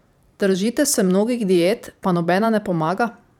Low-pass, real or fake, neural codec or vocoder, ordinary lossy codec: 19.8 kHz; fake; vocoder, 44.1 kHz, 128 mel bands every 512 samples, BigVGAN v2; none